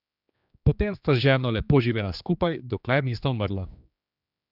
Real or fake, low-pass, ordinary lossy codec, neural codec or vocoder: fake; 5.4 kHz; none; codec, 16 kHz, 2 kbps, X-Codec, HuBERT features, trained on general audio